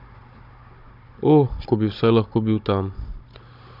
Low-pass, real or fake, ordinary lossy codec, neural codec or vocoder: 5.4 kHz; fake; none; codec, 16 kHz, 16 kbps, FunCodec, trained on Chinese and English, 50 frames a second